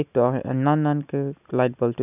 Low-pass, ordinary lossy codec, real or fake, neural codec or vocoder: 3.6 kHz; none; real; none